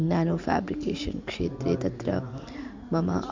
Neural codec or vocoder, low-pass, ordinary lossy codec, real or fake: none; 7.2 kHz; MP3, 64 kbps; real